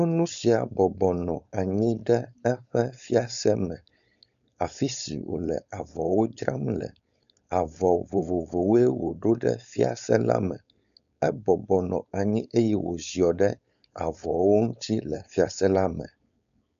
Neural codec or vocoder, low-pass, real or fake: codec, 16 kHz, 4.8 kbps, FACodec; 7.2 kHz; fake